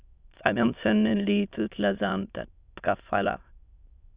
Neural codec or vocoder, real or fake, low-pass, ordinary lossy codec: autoencoder, 22.05 kHz, a latent of 192 numbers a frame, VITS, trained on many speakers; fake; 3.6 kHz; Opus, 64 kbps